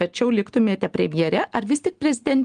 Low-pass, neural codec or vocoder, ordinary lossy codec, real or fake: 9.9 kHz; none; Opus, 24 kbps; real